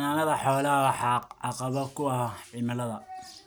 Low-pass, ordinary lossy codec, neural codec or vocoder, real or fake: none; none; none; real